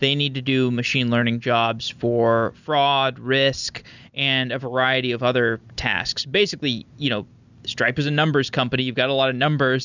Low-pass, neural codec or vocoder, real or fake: 7.2 kHz; none; real